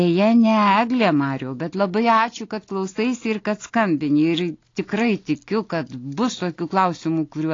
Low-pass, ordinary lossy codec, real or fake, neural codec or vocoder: 7.2 kHz; AAC, 32 kbps; real; none